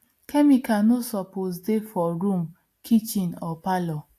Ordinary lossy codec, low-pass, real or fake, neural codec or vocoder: AAC, 64 kbps; 14.4 kHz; real; none